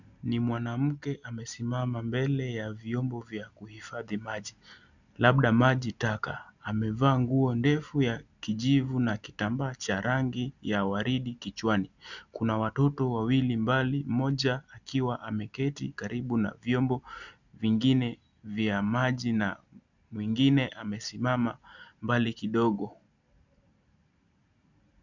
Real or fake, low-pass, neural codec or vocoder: real; 7.2 kHz; none